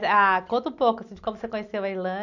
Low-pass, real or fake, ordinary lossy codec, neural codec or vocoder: 7.2 kHz; real; none; none